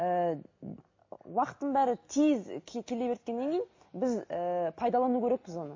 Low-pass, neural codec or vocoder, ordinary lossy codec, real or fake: 7.2 kHz; none; MP3, 32 kbps; real